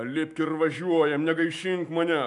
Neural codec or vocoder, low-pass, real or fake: autoencoder, 48 kHz, 128 numbers a frame, DAC-VAE, trained on Japanese speech; 10.8 kHz; fake